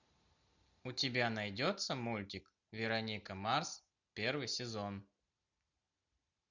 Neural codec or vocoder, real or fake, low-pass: none; real; 7.2 kHz